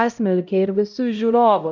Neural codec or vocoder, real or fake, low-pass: codec, 16 kHz, 0.5 kbps, X-Codec, HuBERT features, trained on LibriSpeech; fake; 7.2 kHz